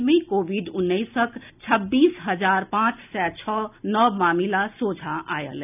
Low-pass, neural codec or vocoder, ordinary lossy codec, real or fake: 3.6 kHz; none; none; real